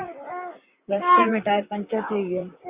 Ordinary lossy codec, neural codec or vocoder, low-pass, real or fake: Opus, 64 kbps; vocoder, 44.1 kHz, 128 mel bands, Pupu-Vocoder; 3.6 kHz; fake